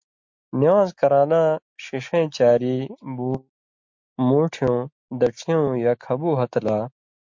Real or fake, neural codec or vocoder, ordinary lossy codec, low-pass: real; none; MP3, 48 kbps; 7.2 kHz